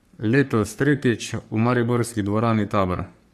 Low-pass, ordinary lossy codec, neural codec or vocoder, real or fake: 14.4 kHz; Opus, 64 kbps; codec, 44.1 kHz, 3.4 kbps, Pupu-Codec; fake